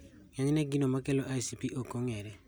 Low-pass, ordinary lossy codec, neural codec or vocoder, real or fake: none; none; none; real